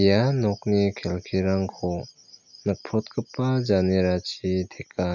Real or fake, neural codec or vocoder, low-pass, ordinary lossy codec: real; none; 7.2 kHz; none